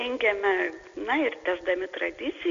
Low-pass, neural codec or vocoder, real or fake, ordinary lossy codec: 7.2 kHz; none; real; Opus, 64 kbps